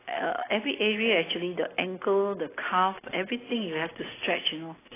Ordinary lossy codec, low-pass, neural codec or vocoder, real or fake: AAC, 16 kbps; 3.6 kHz; none; real